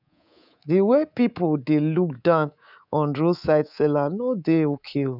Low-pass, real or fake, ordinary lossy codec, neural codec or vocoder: 5.4 kHz; fake; none; codec, 24 kHz, 3.1 kbps, DualCodec